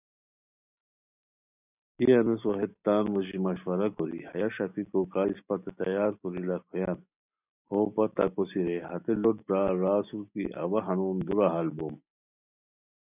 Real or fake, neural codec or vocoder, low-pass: real; none; 3.6 kHz